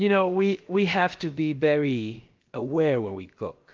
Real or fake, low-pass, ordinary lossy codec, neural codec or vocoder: fake; 7.2 kHz; Opus, 32 kbps; codec, 16 kHz, about 1 kbps, DyCAST, with the encoder's durations